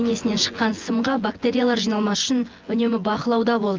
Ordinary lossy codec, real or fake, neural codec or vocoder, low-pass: Opus, 32 kbps; fake; vocoder, 24 kHz, 100 mel bands, Vocos; 7.2 kHz